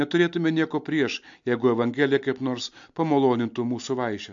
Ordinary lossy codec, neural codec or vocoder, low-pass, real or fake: AAC, 64 kbps; none; 7.2 kHz; real